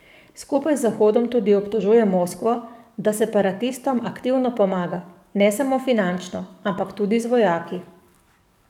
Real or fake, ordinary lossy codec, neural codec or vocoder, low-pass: fake; none; codec, 44.1 kHz, 7.8 kbps, DAC; 19.8 kHz